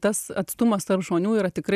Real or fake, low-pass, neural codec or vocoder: real; 14.4 kHz; none